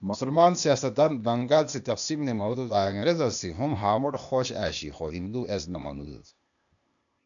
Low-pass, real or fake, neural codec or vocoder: 7.2 kHz; fake; codec, 16 kHz, 0.8 kbps, ZipCodec